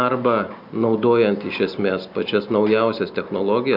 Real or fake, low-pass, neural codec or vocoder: real; 5.4 kHz; none